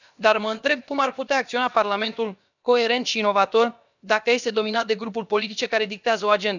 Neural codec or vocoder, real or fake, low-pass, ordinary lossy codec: codec, 16 kHz, about 1 kbps, DyCAST, with the encoder's durations; fake; 7.2 kHz; none